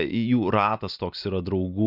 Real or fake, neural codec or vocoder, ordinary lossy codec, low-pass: real; none; Opus, 64 kbps; 5.4 kHz